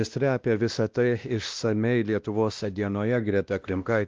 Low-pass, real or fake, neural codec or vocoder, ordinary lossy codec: 7.2 kHz; fake; codec, 16 kHz, 1 kbps, X-Codec, WavLM features, trained on Multilingual LibriSpeech; Opus, 24 kbps